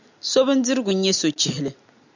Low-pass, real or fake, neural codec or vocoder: 7.2 kHz; real; none